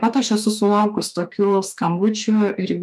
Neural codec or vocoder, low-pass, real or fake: autoencoder, 48 kHz, 32 numbers a frame, DAC-VAE, trained on Japanese speech; 14.4 kHz; fake